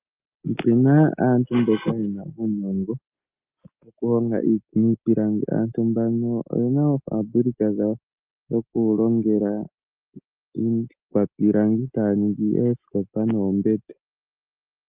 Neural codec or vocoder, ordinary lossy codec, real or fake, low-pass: none; Opus, 32 kbps; real; 3.6 kHz